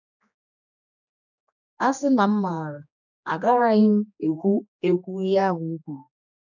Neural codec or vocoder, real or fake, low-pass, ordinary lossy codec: codec, 16 kHz, 2 kbps, X-Codec, HuBERT features, trained on general audio; fake; 7.2 kHz; none